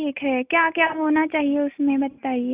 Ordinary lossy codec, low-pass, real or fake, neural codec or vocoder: Opus, 64 kbps; 3.6 kHz; real; none